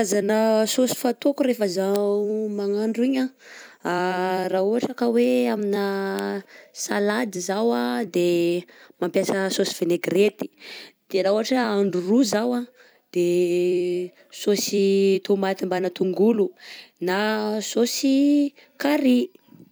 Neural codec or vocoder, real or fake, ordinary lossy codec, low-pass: vocoder, 44.1 kHz, 128 mel bands every 256 samples, BigVGAN v2; fake; none; none